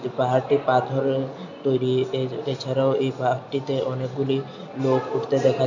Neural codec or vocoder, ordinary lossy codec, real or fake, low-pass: none; none; real; 7.2 kHz